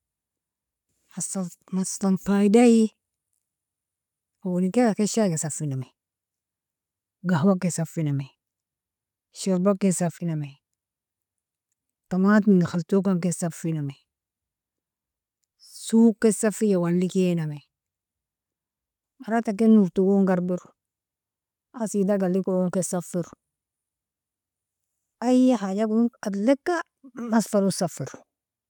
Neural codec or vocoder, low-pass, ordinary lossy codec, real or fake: none; 19.8 kHz; none; real